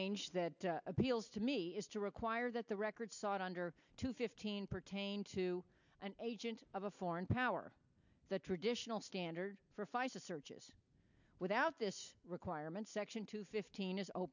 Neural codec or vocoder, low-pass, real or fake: none; 7.2 kHz; real